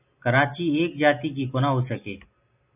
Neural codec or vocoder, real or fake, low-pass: none; real; 3.6 kHz